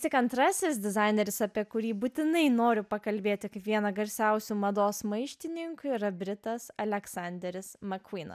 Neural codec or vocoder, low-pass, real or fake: none; 14.4 kHz; real